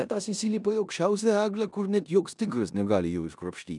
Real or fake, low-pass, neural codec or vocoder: fake; 10.8 kHz; codec, 16 kHz in and 24 kHz out, 0.9 kbps, LongCat-Audio-Codec, four codebook decoder